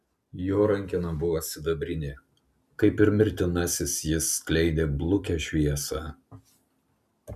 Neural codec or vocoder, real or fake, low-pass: none; real; 14.4 kHz